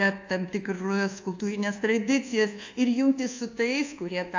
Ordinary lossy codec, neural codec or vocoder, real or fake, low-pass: AAC, 48 kbps; codec, 24 kHz, 1.2 kbps, DualCodec; fake; 7.2 kHz